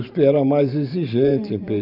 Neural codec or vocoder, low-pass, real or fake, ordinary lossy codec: vocoder, 44.1 kHz, 128 mel bands every 512 samples, BigVGAN v2; 5.4 kHz; fake; none